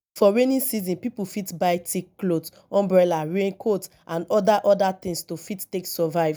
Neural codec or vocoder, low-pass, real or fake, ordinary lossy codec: none; none; real; none